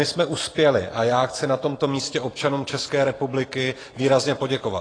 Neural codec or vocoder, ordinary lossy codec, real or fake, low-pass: vocoder, 44.1 kHz, 128 mel bands every 512 samples, BigVGAN v2; AAC, 32 kbps; fake; 9.9 kHz